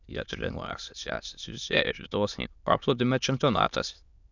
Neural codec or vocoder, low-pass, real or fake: autoencoder, 22.05 kHz, a latent of 192 numbers a frame, VITS, trained on many speakers; 7.2 kHz; fake